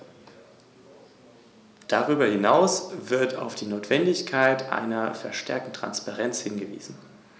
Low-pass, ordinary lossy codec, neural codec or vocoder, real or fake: none; none; none; real